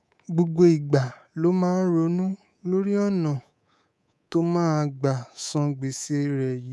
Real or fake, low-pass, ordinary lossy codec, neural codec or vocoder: fake; none; none; codec, 24 kHz, 3.1 kbps, DualCodec